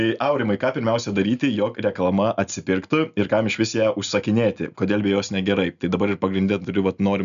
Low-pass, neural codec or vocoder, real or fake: 7.2 kHz; none; real